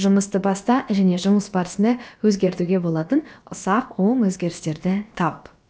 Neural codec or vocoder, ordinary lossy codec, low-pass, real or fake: codec, 16 kHz, about 1 kbps, DyCAST, with the encoder's durations; none; none; fake